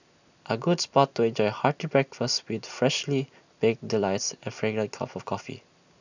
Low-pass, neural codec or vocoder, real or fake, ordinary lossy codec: 7.2 kHz; none; real; none